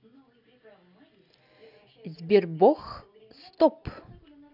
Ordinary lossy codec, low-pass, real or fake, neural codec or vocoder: none; 5.4 kHz; real; none